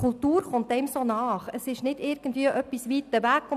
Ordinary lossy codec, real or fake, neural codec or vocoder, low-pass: none; real; none; 14.4 kHz